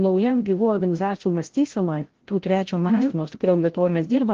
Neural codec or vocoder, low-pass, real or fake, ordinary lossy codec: codec, 16 kHz, 0.5 kbps, FreqCodec, larger model; 7.2 kHz; fake; Opus, 16 kbps